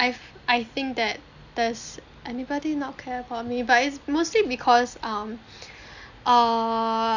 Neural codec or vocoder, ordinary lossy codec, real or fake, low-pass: none; none; real; 7.2 kHz